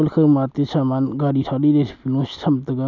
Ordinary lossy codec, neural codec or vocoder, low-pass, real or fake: none; none; 7.2 kHz; real